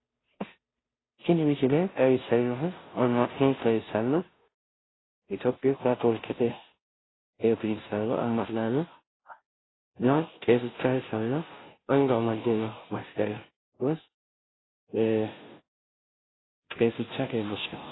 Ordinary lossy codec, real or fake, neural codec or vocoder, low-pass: AAC, 16 kbps; fake; codec, 16 kHz, 0.5 kbps, FunCodec, trained on Chinese and English, 25 frames a second; 7.2 kHz